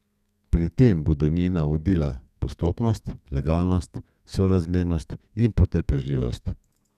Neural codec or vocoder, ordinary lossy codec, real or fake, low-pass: codec, 32 kHz, 1.9 kbps, SNAC; none; fake; 14.4 kHz